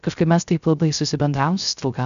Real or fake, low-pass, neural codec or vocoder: fake; 7.2 kHz; codec, 16 kHz, 0.3 kbps, FocalCodec